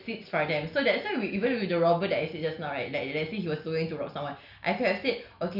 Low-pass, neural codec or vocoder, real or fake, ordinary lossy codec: 5.4 kHz; none; real; none